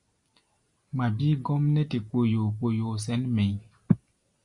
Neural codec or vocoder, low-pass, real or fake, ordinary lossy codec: none; 10.8 kHz; real; Opus, 64 kbps